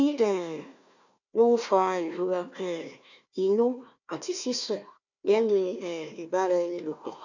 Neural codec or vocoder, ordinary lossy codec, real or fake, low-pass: codec, 16 kHz, 1 kbps, FunCodec, trained on Chinese and English, 50 frames a second; none; fake; 7.2 kHz